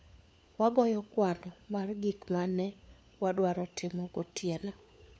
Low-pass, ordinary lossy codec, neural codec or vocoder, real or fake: none; none; codec, 16 kHz, 4 kbps, FunCodec, trained on LibriTTS, 50 frames a second; fake